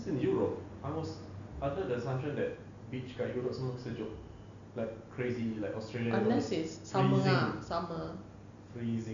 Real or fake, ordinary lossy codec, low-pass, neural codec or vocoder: real; none; 7.2 kHz; none